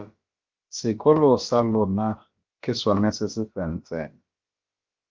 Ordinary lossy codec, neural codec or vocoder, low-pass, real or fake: Opus, 24 kbps; codec, 16 kHz, about 1 kbps, DyCAST, with the encoder's durations; 7.2 kHz; fake